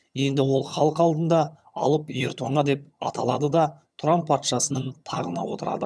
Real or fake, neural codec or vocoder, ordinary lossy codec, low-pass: fake; vocoder, 22.05 kHz, 80 mel bands, HiFi-GAN; none; none